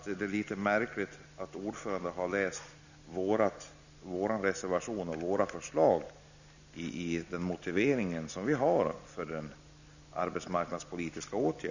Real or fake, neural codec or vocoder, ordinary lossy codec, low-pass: real; none; none; 7.2 kHz